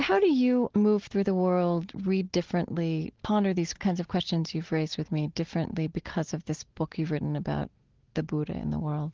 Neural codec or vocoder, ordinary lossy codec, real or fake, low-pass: none; Opus, 16 kbps; real; 7.2 kHz